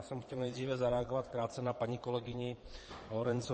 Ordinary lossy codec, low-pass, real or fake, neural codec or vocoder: MP3, 32 kbps; 9.9 kHz; fake; vocoder, 22.05 kHz, 80 mel bands, Vocos